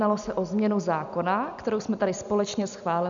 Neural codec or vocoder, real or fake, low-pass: none; real; 7.2 kHz